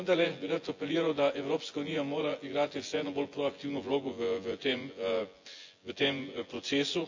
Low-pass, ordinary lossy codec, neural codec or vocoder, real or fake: 7.2 kHz; AAC, 48 kbps; vocoder, 24 kHz, 100 mel bands, Vocos; fake